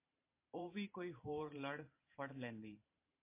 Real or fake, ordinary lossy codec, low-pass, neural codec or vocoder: fake; MP3, 24 kbps; 3.6 kHz; vocoder, 44.1 kHz, 128 mel bands every 512 samples, BigVGAN v2